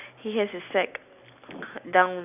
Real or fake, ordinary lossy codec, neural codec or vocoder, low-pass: real; none; none; 3.6 kHz